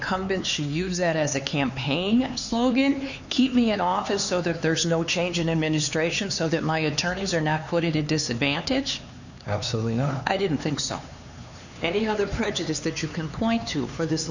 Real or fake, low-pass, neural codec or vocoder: fake; 7.2 kHz; codec, 16 kHz, 4 kbps, X-Codec, HuBERT features, trained on LibriSpeech